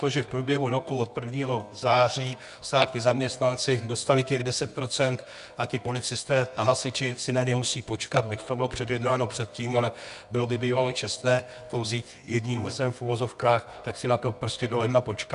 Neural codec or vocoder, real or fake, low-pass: codec, 24 kHz, 0.9 kbps, WavTokenizer, medium music audio release; fake; 10.8 kHz